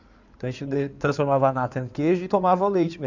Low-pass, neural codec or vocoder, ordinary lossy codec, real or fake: 7.2 kHz; codec, 16 kHz in and 24 kHz out, 2.2 kbps, FireRedTTS-2 codec; none; fake